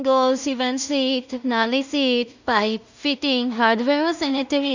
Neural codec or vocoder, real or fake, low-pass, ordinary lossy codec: codec, 16 kHz in and 24 kHz out, 0.4 kbps, LongCat-Audio-Codec, two codebook decoder; fake; 7.2 kHz; none